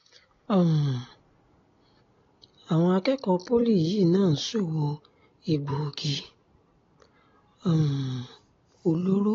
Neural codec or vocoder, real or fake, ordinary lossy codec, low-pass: none; real; AAC, 32 kbps; 7.2 kHz